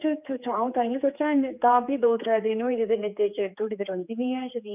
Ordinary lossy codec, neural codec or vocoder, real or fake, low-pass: none; codec, 16 kHz, 4 kbps, X-Codec, HuBERT features, trained on general audio; fake; 3.6 kHz